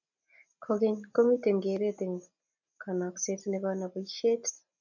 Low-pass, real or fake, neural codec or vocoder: 7.2 kHz; real; none